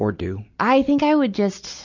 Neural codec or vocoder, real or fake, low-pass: none; real; 7.2 kHz